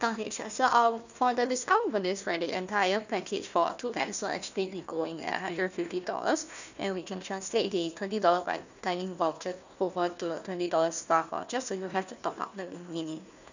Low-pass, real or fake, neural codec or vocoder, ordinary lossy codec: 7.2 kHz; fake; codec, 16 kHz, 1 kbps, FunCodec, trained on Chinese and English, 50 frames a second; none